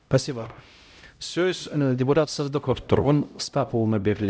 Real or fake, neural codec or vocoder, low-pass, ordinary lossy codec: fake; codec, 16 kHz, 0.5 kbps, X-Codec, HuBERT features, trained on LibriSpeech; none; none